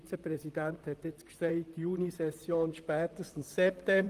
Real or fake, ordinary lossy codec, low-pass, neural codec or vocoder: fake; Opus, 24 kbps; 14.4 kHz; vocoder, 44.1 kHz, 128 mel bands, Pupu-Vocoder